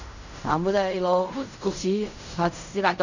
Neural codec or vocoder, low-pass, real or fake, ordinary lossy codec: codec, 16 kHz in and 24 kHz out, 0.4 kbps, LongCat-Audio-Codec, fine tuned four codebook decoder; 7.2 kHz; fake; none